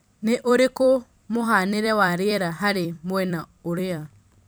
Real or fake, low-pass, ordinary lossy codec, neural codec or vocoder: fake; none; none; vocoder, 44.1 kHz, 128 mel bands every 256 samples, BigVGAN v2